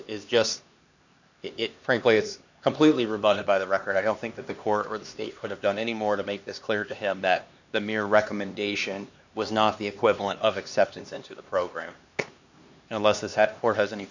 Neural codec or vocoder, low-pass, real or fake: codec, 16 kHz, 2 kbps, X-Codec, HuBERT features, trained on LibriSpeech; 7.2 kHz; fake